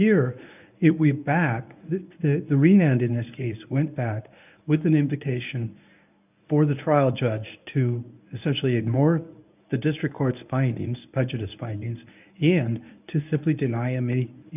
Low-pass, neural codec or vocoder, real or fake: 3.6 kHz; codec, 24 kHz, 0.9 kbps, WavTokenizer, medium speech release version 1; fake